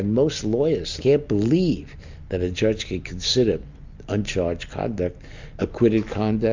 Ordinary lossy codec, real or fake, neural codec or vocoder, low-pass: MP3, 64 kbps; real; none; 7.2 kHz